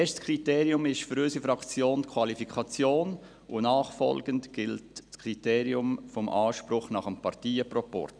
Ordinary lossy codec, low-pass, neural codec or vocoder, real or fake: none; 9.9 kHz; none; real